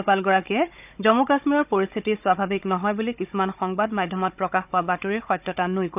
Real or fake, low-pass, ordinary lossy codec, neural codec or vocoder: fake; 3.6 kHz; none; codec, 16 kHz, 16 kbps, FunCodec, trained on Chinese and English, 50 frames a second